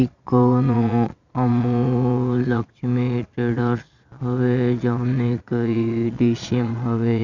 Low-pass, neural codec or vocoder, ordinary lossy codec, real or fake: 7.2 kHz; vocoder, 22.05 kHz, 80 mel bands, Vocos; none; fake